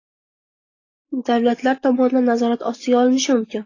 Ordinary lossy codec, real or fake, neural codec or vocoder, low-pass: AAC, 32 kbps; real; none; 7.2 kHz